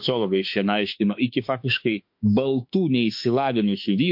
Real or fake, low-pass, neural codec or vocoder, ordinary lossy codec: fake; 5.4 kHz; autoencoder, 48 kHz, 32 numbers a frame, DAC-VAE, trained on Japanese speech; MP3, 48 kbps